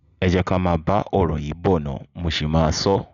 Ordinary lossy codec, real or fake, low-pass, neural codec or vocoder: none; real; 7.2 kHz; none